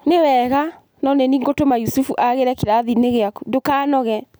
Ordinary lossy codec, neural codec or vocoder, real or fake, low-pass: none; none; real; none